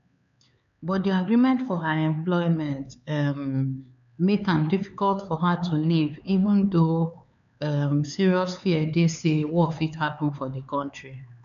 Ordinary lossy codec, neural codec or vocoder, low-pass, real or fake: none; codec, 16 kHz, 4 kbps, X-Codec, HuBERT features, trained on LibriSpeech; 7.2 kHz; fake